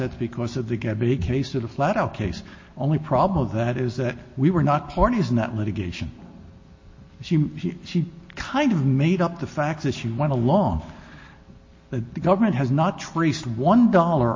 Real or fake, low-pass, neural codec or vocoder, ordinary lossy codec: real; 7.2 kHz; none; MP3, 32 kbps